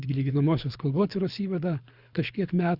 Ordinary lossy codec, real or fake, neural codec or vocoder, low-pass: Opus, 64 kbps; fake; codec, 24 kHz, 6 kbps, HILCodec; 5.4 kHz